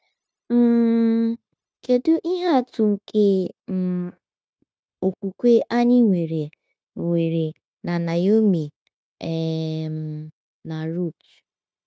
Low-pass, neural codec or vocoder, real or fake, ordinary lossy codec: none; codec, 16 kHz, 0.9 kbps, LongCat-Audio-Codec; fake; none